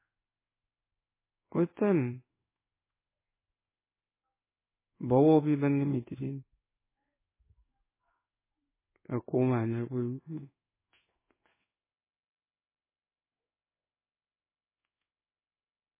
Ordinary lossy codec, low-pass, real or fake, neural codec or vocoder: MP3, 16 kbps; 3.6 kHz; fake; codec, 16 kHz in and 24 kHz out, 1 kbps, XY-Tokenizer